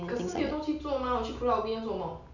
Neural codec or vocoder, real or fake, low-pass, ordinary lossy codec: none; real; 7.2 kHz; none